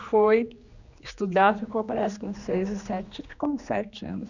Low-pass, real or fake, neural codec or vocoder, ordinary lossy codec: 7.2 kHz; fake; codec, 16 kHz, 2 kbps, X-Codec, HuBERT features, trained on general audio; none